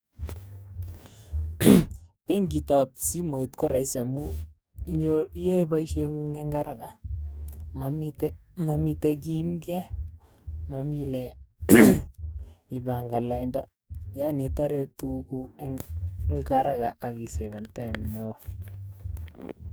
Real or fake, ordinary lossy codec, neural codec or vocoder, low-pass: fake; none; codec, 44.1 kHz, 2.6 kbps, DAC; none